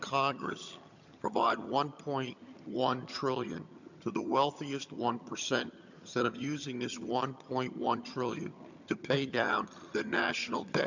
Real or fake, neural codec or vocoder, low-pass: fake; vocoder, 22.05 kHz, 80 mel bands, HiFi-GAN; 7.2 kHz